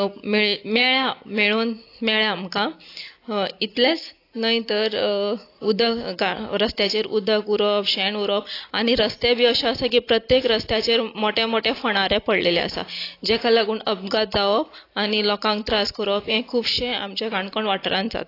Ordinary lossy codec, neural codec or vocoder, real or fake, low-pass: AAC, 32 kbps; none; real; 5.4 kHz